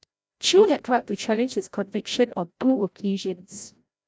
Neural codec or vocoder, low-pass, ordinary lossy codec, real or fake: codec, 16 kHz, 0.5 kbps, FreqCodec, larger model; none; none; fake